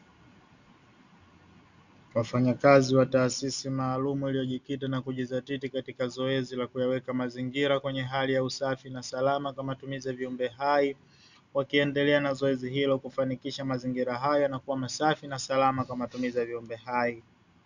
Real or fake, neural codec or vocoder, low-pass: real; none; 7.2 kHz